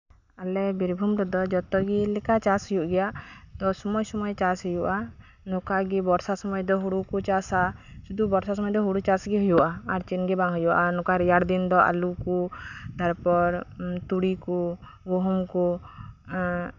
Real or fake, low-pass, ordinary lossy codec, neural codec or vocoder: real; 7.2 kHz; none; none